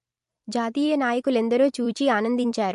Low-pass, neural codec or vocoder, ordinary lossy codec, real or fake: 14.4 kHz; vocoder, 44.1 kHz, 128 mel bands every 256 samples, BigVGAN v2; MP3, 48 kbps; fake